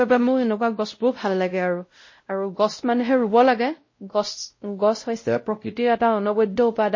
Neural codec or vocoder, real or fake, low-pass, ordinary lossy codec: codec, 16 kHz, 0.5 kbps, X-Codec, WavLM features, trained on Multilingual LibriSpeech; fake; 7.2 kHz; MP3, 32 kbps